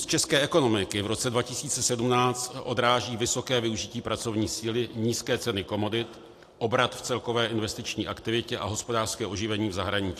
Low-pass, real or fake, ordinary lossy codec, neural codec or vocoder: 14.4 kHz; real; AAC, 64 kbps; none